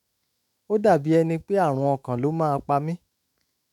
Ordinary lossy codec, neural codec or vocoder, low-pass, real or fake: none; autoencoder, 48 kHz, 128 numbers a frame, DAC-VAE, trained on Japanese speech; 19.8 kHz; fake